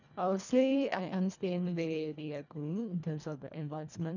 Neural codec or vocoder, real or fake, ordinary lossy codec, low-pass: codec, 24 kHz, 1.5 kbps, HILCodec; fake; none; 7.2 kHz